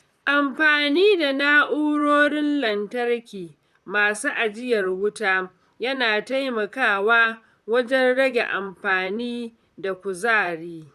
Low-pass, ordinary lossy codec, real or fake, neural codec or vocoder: 14.4 kHz; none; fake; vocoder, 44.1 kHz, 128 mel bands, Pupu-Vocoder